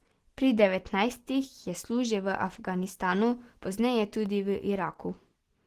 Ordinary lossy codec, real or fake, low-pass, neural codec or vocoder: Opus, 16 kbps; real; 14.4 kHz; none